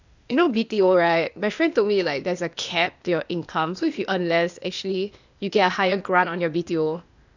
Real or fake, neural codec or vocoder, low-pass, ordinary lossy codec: fake; codec, 16 kHz, 0.8 kbps, ZipCodec; 7.2 kHz; none